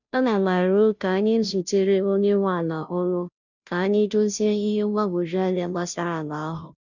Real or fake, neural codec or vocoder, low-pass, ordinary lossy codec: fake; codec, 16 kHz, 0.5 kbps, FunCodec, trained on Chinese and English, 25 frames a second; 7.2 kHz; none